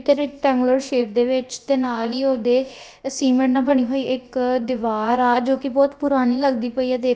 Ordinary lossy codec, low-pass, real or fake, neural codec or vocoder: none; none; fake; codec, 16 kHz, about 1 kbps, DyCAST, with the encoder's durations